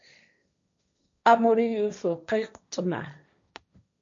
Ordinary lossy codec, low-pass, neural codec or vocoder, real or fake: MP3, 64 kbps; 7.2 kHz; codec, 16 kHz, 1.1 kbps, Voila-Tokenizer; fake